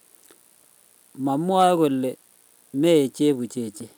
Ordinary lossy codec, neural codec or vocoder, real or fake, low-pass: none; none; real; none